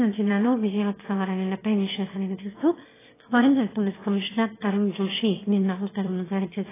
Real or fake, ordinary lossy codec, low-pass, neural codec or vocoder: fake; AAC, 16 kbps; 3.6 kHz; autoencoder, 22.05 kHz, a latent of 192 numbers a frame, VITS, trained on one speaker